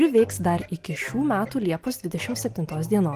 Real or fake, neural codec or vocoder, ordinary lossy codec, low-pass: real; none; Opus, 24 kbps; 14.4 kHz